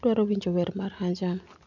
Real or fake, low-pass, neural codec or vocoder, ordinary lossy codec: real; 7.2 kHz; none; none